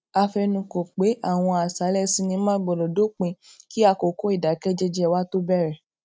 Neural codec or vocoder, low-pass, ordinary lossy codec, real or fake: none; none; none; real